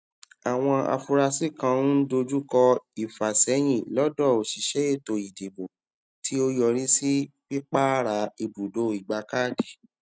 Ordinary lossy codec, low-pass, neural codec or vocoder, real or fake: none; none; none; real